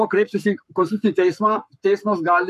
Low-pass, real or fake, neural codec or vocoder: 14.4 kHz; fake; codec, 44.1 kHz, 7.8 kbps, Pupu-Codec